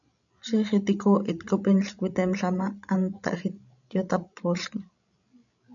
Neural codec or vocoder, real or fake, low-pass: codec, 16 kHz, 16 kbps, FreqCodec, larger model; fake; 7.2 kHz